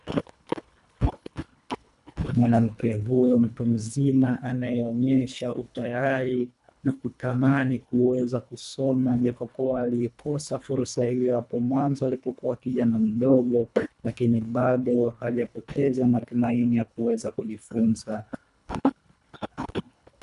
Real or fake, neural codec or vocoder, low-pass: fake; codec, 24 kHz, 1.5 kbps, HILCodec; 10.8 kHz